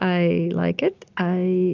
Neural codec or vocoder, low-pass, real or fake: none; 7.2 kHz; real